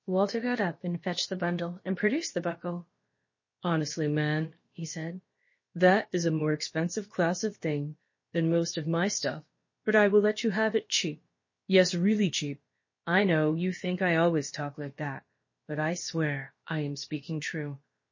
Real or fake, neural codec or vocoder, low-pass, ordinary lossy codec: fake; codec, 16 kHz, about 1 kbps, DyCAST, with the encoder's durations; 7.2 kHz; MP3, 32 kbps